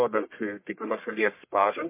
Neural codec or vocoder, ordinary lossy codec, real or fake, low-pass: codec, 44.1 kHz, 1.7 kbps, Pupu-Codec; MP3, 24 kbps; fake; 3.6 kHz